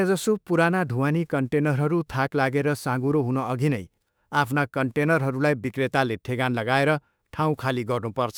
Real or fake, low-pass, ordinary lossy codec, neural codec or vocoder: fake; none; none; autoencoder, 48 kHz, 32 numbers a frame, DAC-VAE, trained on Japanese speech